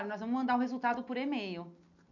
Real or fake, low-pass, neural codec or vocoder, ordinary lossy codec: real; 7.2 kHz; none; none